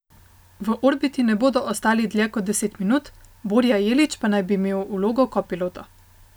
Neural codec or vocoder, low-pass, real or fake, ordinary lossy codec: none; none; real; none